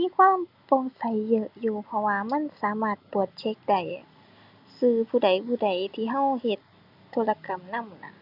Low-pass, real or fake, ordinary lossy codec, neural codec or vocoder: 5.4 kHz; real; none; none